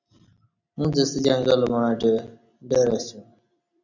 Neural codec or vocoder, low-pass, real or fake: none; 7.2 kHz; real